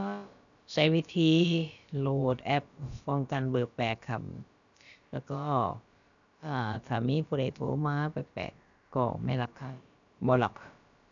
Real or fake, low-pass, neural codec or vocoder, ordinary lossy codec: fake; 7.2 kHz; codec, 16 kHz, about 1 kbps, DyCAST, with the encoder's durations; none